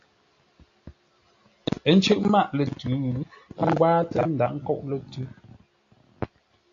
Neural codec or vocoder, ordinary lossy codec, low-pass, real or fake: none; AAC, 48 kbps; 7.2 kHz; real